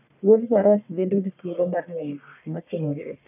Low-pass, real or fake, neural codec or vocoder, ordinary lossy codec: 3.6 kHz; fake; codec, 44.1 kHz, 1.7 kbps, Pupu-Codec; none